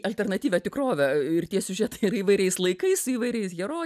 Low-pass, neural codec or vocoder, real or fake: 14.4 kHz; none; real